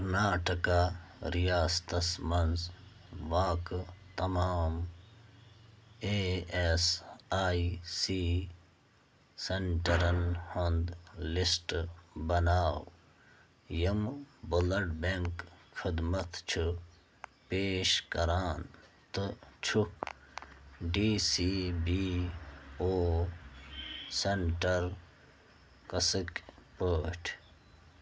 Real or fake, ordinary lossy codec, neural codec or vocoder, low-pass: real; none; none; none